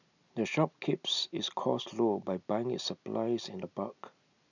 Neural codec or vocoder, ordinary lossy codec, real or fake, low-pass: none; none; real; 7.2 kHz